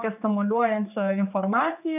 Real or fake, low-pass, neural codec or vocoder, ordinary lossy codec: fake; 3.6 kHz; codec, 16 kHz, 4 kbps, X-Codec, HuBERT features, trained on general audio; AAC, 32 kbps